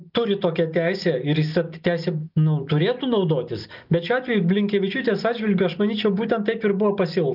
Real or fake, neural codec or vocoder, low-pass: real; none; 5.4 kHz